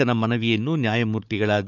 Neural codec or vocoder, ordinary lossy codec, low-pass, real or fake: codec, 16 kHz, 4 kbps, FunCodec, trained on Chinese and English, 50 frames a second; none; 7.2 kHz; fake